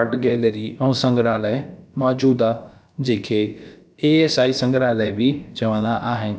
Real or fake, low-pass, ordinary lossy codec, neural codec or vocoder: fake; none; none; codec, 16 kHz, about 1 kbps, DyCAST, with the encoder's durations